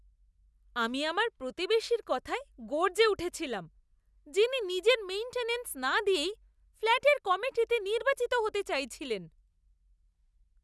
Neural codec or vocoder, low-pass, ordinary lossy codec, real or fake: none; none; none; real